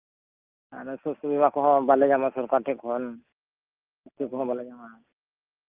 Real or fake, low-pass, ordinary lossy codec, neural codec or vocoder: real; 3.6 kHz; Opus, 24 kbps; none